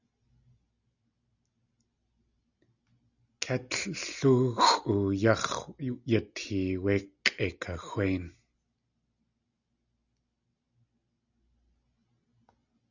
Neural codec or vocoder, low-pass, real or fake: none; 7.2 kHz; real